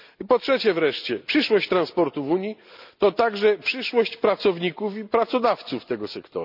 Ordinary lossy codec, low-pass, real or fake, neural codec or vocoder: none; 5.4 kHz; real; none